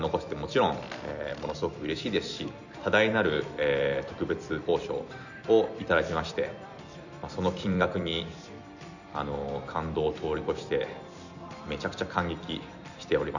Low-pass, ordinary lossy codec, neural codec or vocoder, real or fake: 7.2 kHz; none; vocoder, 44.1 kHz, 128 mel bands every 256 samples, BigVGAN v2; fake